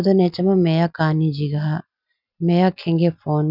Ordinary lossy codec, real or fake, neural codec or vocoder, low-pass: none; real; none; 5.4 kHz